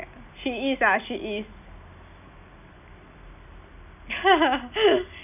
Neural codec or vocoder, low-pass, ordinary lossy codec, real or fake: none; 3.6 kHz; none; real